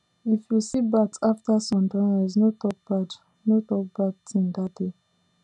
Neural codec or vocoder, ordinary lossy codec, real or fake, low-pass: none; none; real; 10.8 kHz